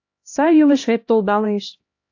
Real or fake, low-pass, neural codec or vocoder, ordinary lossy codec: fake; 7.2 kHz; codec, 16 kHz, 1 kbps, X-Codec, HuBERT features, trained on LibriSpeech; AAC, 48 kbps